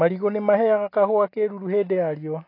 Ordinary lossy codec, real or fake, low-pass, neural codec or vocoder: AAC, 32 kbps; real; 5.4 kHz; none